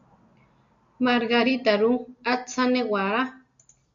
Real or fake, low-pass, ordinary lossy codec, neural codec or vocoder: real; 7.2 kHz; AAC, 64 kbps; none